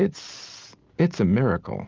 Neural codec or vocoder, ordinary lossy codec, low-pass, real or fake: none; Opus, 32 kbps; 7.2 kHz; real